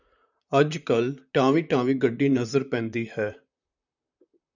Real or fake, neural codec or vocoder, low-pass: fake; vocoder, 44.1 kHz, 128 mel bands, Pupu-Vocoder; 7.2 kHz